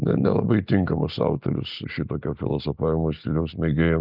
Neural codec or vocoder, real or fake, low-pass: none; real; 5.4 kHz